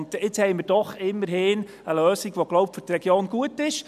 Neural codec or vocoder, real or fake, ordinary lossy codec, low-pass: none; real; none; 14.4 kHz